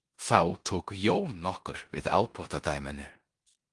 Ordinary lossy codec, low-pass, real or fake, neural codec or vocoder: Opus, 24 kbps; 10.8 kHz; fake; codec, 16 kHz in and 24 kHz out, 0.9 kbps, LongCat-Audio-Codec, four codebook decoder